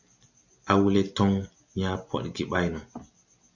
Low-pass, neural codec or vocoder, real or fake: 7.2 kHz; none; real